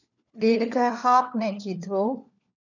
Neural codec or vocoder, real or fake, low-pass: codec, 16 kHz, 4 kbps, FunCodec, trained on LibriTTS, 50 frames a second; fake; 7.2 kHz